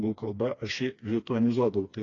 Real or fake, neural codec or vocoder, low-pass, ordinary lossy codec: fake; codec, 16 kHz, 2 kbps, FreqCodec, smaller model; 7.2 kHz; AAC, 32 kbps